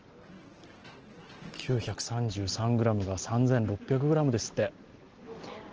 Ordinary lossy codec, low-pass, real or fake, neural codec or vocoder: Opus, 16 kbps; 7.2 kHz; real; none